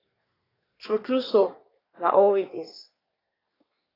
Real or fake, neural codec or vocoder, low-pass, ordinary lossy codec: fake; codec, 24 kHz, 1 kbps, SNAC; 5.4 kHz; AAC, 24 kbps